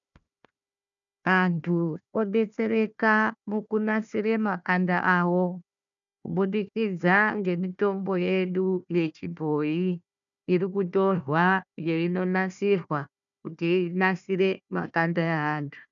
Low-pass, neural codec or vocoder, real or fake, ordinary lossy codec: 7.2 kHz; codec, 16 kHz, 1 kbps, FunCodec, trained on Chinese and English, 50 frames a second; fake; MP3, 96 kbps